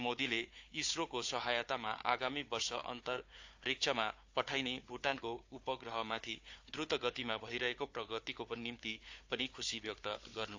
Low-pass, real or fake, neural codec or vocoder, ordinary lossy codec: 7.2 kHz; fake; codec, 16 kHz, 4 kbps, FunCodec, trained on Chinese and English, 50 frames a second; AAC, 48 kbps